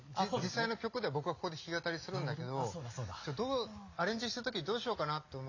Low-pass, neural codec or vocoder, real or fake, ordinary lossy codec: 7.2 kHz; none; real; AAC, 48 kbps